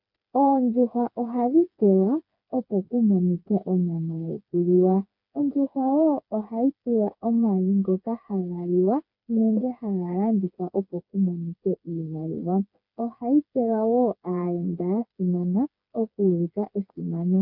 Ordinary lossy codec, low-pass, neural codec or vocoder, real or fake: MP3, 48 kbps; 5.4 kHz; codec, 16 kHz, 4 kbps, FreqCodec, smaller model; fake